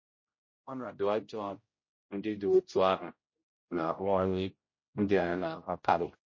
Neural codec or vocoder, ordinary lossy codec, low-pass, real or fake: codec, 16 kHz, 0.5 kbps, X-Codec, HuBERT features, trained on general audio; MP3, 32 kbps; 7.2 kHz; fake